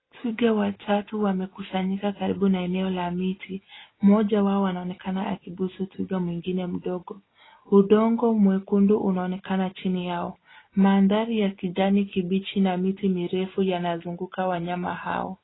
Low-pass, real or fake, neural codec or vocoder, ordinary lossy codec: 7.2 kHz; real; none; AAC, 16 kbps